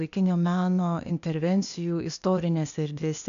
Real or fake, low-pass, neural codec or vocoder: fake; 7.2 kHz; codec, 16 kHz, 0.8 kbps, ZipCodec